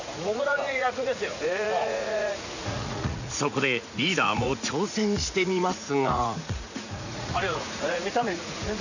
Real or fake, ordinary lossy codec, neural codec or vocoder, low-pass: fake; none; vocoder, 44.1 kHz, 80 mel bands, Vocos; 7.2 kHz